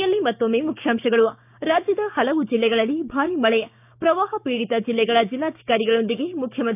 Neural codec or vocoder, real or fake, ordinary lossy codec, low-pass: codec, 16 kHz, 6 kbps, DAC; fake; none; 3.6 kHz